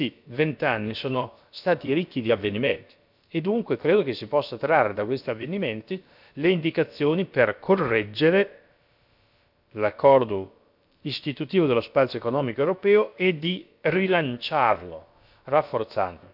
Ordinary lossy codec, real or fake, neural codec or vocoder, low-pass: none; fake; codec, 16 kHz, about 1 kbps, DyCAST, with the encoder's durations; 5.4 kHz